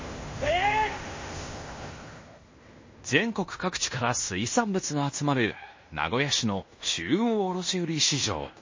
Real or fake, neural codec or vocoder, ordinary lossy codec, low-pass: fake; codec, 16 kHz in and 24 kHz out, 0.9 kbps, LongCat-Audio-Codec, fine tuned four codebook decoder; MP3, 32 kbps; 7.2 kHz